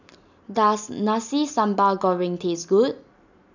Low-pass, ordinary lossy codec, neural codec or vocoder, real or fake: 7.2 kHz; none; none; real